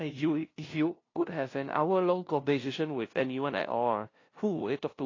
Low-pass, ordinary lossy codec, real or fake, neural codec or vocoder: 7.2 kHz; AAC, 32 kbps; fake; codec, 16 kHz, 0.5 kbps, FunCodec, trained on LibriTTS, 25 frames a second